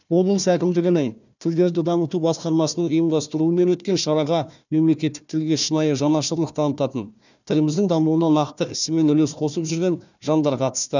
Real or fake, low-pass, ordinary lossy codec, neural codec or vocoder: fake; 7.2 kHz; none; codec, 16 kHz, 1 kbps, FunCodec, trained on Chinese and English, 50 frames a second